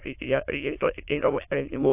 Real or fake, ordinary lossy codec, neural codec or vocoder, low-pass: fake; AAC, 32 kbps; autoencoder, 22.05 kHz, a latent of 192 numbers a frame, VITS, trained on many speakers; 3.6 kHz